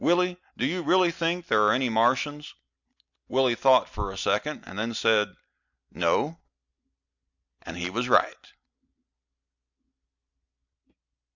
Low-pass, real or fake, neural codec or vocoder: 7.2 kHz; real; none